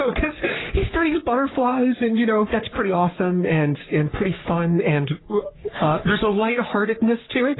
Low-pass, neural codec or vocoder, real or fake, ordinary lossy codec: 7.2 kHz; codec, 44.1 kHz, 3.4 kbps, Pupu-Codec; fake; AAC, 16 kbps